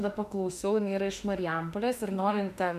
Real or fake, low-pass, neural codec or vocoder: fake; 14.4 kHz; autoencoder, 48 kHz, 32 numbers a frame, DAC-VAE, trained on Japanese speech